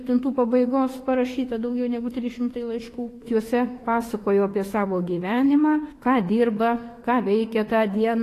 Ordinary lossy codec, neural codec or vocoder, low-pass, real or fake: AAC, 48 kbps; autoencoder, 48 kHz, 32 numbers a frame, DAC-VAE, trained on Japanese speech; 14.4 kHz; fake